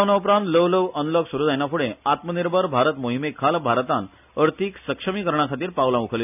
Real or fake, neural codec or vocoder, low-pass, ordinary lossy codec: real; none; 3.6 kHz; none